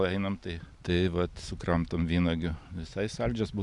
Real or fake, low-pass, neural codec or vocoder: real; 10.8 kHz; none